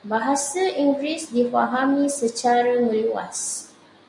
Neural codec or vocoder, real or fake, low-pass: none; real; 10.8 kHz